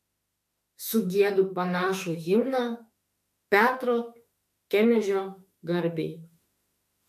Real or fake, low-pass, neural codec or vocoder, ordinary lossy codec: fake; 14.4 kHz; autoencoder, 48 kHz, 32 numbers a frame, DAC-VAE, trained on Japanese speech; MP3, 64 kbps